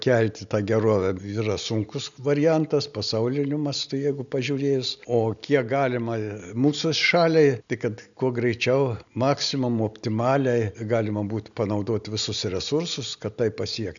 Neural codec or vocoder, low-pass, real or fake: none; 7.2 kHz; real